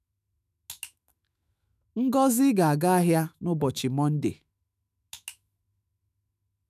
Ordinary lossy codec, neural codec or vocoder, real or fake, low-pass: none; autoencoder, 48 kHz, 128 numbers a frame, DAC-VAE, trained on Japanese speech; fake; 14.4 kHz